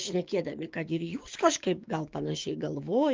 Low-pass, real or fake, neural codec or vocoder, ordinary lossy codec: 7.2 kHz; fake; codec, 16 kHz, 6 kbps, DAC; Opus, 32 kbps